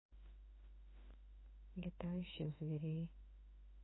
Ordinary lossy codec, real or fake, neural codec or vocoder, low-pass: AAC, 16 kbps; fake; autoencoder, 48 kHz, 32 numbers a frame, DAC-VAE, trained on Japanese speech; 7.2 kHz